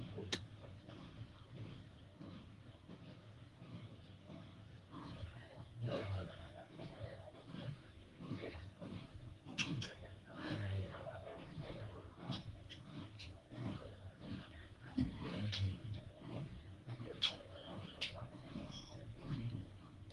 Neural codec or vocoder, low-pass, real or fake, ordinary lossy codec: codec, 24 kHz, 1 kbps, SNAC; 10.8 kHz; fake; Opus, 24 kbps